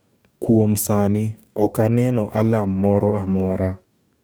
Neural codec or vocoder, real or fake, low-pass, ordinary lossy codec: codec, 44.1 kHz, 2.6 kbps, DAC; fake; none; none